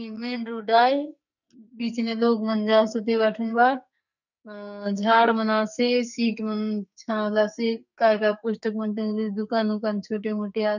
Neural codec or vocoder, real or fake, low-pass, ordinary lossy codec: codec, 44.1 kHz, 2.6 kbps, SNAC; fake; 7.2 kHz; none